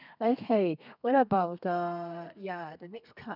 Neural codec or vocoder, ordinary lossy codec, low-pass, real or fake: codec, 32 kHz, 1.9 kbps, SNAC; none; 5.4 kHz; fake